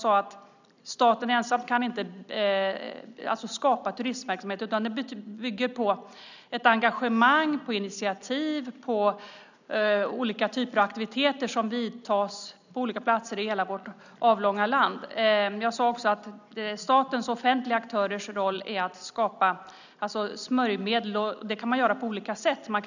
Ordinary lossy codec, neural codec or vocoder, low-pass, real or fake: none; none; 7.2 kHz; real